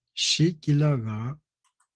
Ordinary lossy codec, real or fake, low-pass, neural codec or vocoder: Opus, 16 kbps; real; 9.9 kHz; none